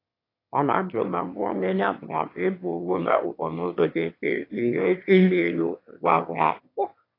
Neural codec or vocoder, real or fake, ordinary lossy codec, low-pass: autoencoder, 22.05 kHz, a latent of 192 numbers a frame, VITS, trained on one speaker; fake; AAC, 32 kbps; 5.4 kHz